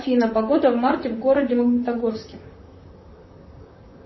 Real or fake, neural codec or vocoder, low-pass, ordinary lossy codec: fake; vocoder, 44.1 kHz, 128 mel bands, Pupu-Vocoder; 7.2 kHz; MP3, 24 kbps